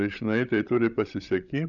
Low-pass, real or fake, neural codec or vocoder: 7.2 kHz; fake; codec, 16 kHz, 16 kbps, FreqCodec, larger model